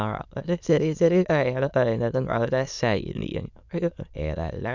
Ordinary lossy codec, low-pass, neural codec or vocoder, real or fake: none; 7.2 kHz; autoencoder, 22.05 kHz, a latent of 192 numbers a frame, VITS, trained on many speakers; fake